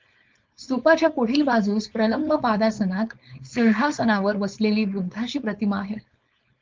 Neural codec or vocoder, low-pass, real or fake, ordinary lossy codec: codec, 16 kHz, 4.8 kbps, FACodec; 7.2 kHz; fake; Opus, 32 kbps